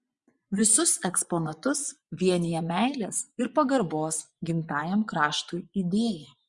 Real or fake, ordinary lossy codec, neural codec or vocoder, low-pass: fake; Opus, 64 kbps; vocoder, 24 kHz, 100 mel bands, Vocos; 10.8 kHz